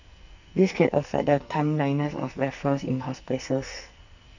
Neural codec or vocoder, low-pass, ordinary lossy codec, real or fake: codec, 44.1 kHz, 2.6 kbps, SNAC; 7.2 kHz; none; fake